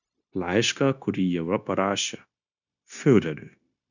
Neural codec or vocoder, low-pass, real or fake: codec, 16 kHz, 0.9 kbps, LongCat-Audio-Codec; 7.2 kHz; fake